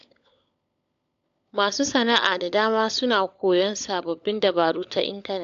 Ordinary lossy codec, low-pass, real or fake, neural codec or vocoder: AAC, 64 kbps; 7.2 kHz; fake; codec, 16 kHz, 16 kbps, FunCodec, trained on LibriTTS, 50 frames a second